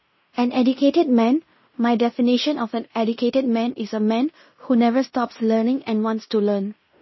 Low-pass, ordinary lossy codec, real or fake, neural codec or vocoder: 7.2 kHz; MP3, 24 kbps; fake; codec, 16 kHz in and 24 kHz out, 0.9 kbps, LongCat-Audio-Codec, fine tuned four codebook decoder